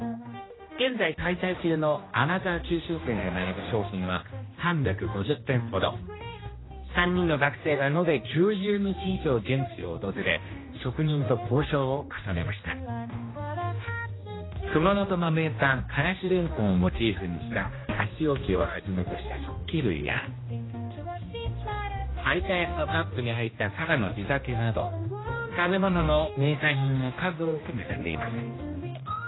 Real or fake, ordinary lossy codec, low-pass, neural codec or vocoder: fake; AAC, 16 kbps; 7.2 kHz; codec, 16 kHz, 1 kbps, X-Codec, HuBERT features, trained on general audio